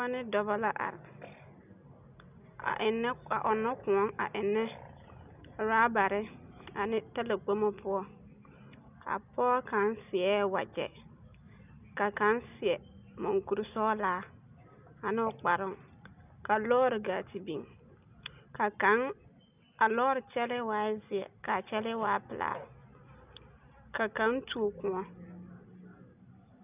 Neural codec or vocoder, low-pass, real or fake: none; 3.6 kHz; real